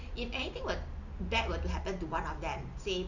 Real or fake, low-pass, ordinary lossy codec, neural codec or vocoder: real; 7.2 kHz; none; none